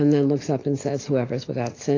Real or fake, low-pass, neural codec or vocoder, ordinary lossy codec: real; 7.2 kHz; none; AAC, 32 kbps